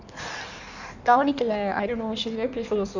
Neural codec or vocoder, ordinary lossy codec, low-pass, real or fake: codec, 16 kHz in and 24 kHz out, 1.1 kbps, FireRedTTS-2 codec; none; 7.2 kHz; fake